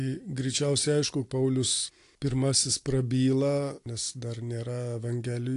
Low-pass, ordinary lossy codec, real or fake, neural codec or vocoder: 10.8 kHz; AAC, 48 kbps; real; none